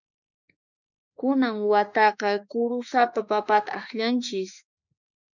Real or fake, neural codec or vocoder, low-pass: fake; autoencoder, 48 kHz, 32 numbers a frame, DAC-VAE, trained on Japanese speech; 7.2 kHz